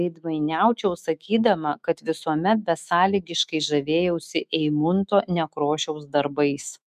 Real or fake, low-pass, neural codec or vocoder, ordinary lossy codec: fake; 14.4 kHz; autoencoder, 48 kHz, 128 numbers a frame, DAC-VAE, trained on Japanese speech; MP3, 96 kbps